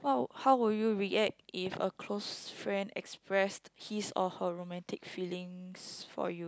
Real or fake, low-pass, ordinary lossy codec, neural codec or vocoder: real; none; none; none